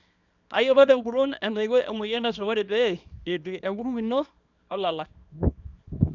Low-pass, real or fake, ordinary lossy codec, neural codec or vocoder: 7.2 kHz; fake; none; codec, 24 kHz, 0.9 kbps, WavTokenizer, small release